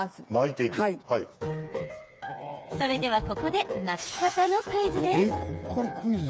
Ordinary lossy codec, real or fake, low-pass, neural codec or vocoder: none; fake; none; codec, 16 kHz, 4 kbps, FreqCodec, smaller model